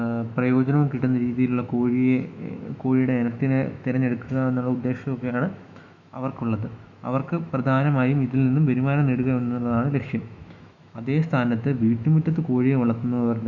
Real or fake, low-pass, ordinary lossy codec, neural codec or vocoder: fake; 7.2 kHz; none; autoencoder, 48 kHz, 128 numbers a frame, DAC-VAE, trained on Japanese speech